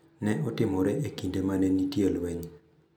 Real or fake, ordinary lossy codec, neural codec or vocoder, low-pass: real; none; none; none